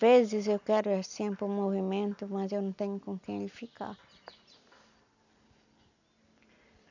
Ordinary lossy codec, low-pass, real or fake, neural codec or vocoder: none; 7.2 kHz; real; none